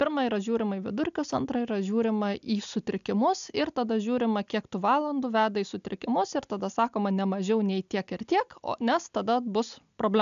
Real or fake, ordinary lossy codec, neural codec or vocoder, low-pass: real; AAC, 96 kbps; none; 7.2 kHz